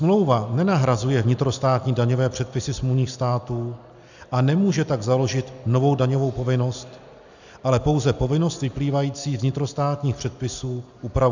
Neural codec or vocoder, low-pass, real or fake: none; 7.2 kHz; real